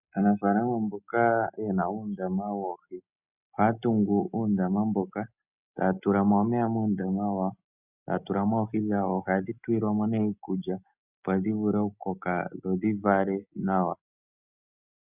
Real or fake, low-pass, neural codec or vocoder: real; 3.6 kHz; none